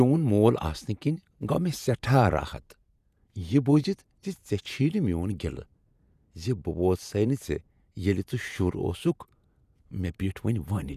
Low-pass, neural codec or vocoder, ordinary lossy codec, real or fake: 14.4 kHz; vocoder, 48 kHz, 128 mel bands, Vocos; none; fake